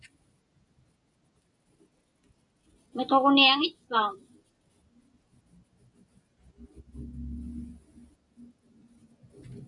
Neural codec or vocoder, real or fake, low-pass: none; real; 10.8 kHz